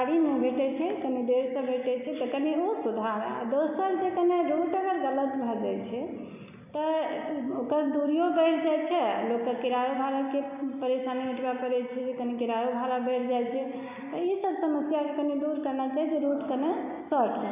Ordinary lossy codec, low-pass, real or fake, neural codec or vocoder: none; 3.6 kHz; real; none